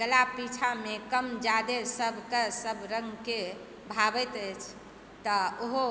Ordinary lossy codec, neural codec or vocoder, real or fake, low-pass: none; none; real; none